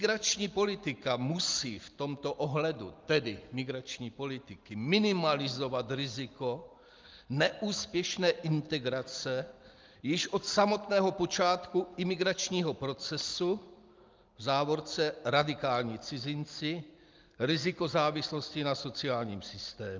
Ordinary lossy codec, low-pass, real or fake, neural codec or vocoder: Opus, 24 kbps; 7.2 kHz; real; none